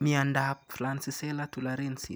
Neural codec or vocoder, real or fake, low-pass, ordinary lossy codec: none; real; none; none